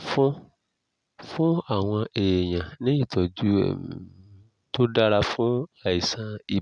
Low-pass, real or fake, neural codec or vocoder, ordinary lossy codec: 9.9 kHz; real; none; none